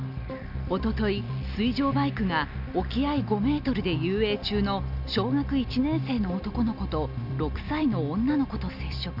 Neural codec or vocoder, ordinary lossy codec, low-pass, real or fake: none; none; 5.4 kHz; real